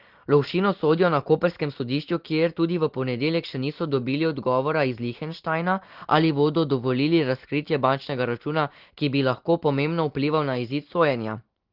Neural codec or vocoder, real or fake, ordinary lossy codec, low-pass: none; real; Opus, 16 kbps; 5.4 kHz